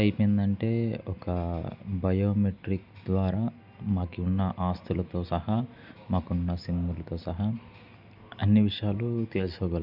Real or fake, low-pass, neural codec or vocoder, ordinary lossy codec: real; 5.4 kHz; none; none